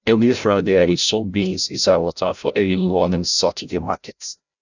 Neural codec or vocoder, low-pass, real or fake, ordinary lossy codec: codec, 16 kHz, 0.5 kbps, FreqCodec, larger model; 7.2 kHz; fake; none